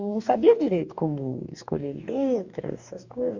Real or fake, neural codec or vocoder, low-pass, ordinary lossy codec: fake; codec, 44.1 kHz, 2.6 kbps, DAC; 7.2 kHz; Opus, 64 kbps